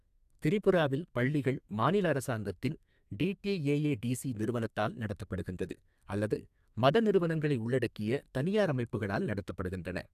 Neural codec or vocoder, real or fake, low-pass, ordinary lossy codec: codec, 32 kHz, 1.9 kbps, SNAC; fake; 14.4 kHz; AAC, 96 kbps